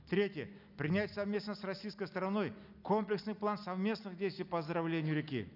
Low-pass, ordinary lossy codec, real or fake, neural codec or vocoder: 5.4 kHz; none; real; none